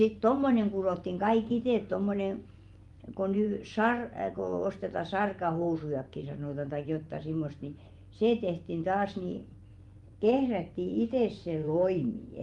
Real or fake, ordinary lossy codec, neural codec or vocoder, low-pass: fake; Opus, 32 kbps; vocoder, 24 kHz, 100 mel bands, Vocos; 10.8 kHz